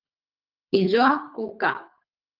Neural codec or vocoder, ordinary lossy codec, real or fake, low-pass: codec, 24 kHz, 3 kbps, HILCodec; Opus, 24 kbps; fake; 5.4 kHz